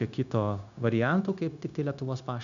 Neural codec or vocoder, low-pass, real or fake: codec, 16 kHz, 0.9 kbps, LongCat-Audio-Codec; 7.2 kHz; fake